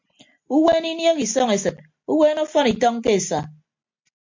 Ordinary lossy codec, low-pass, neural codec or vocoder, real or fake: MP3, 48 kbps; 7.2 kHz; none; real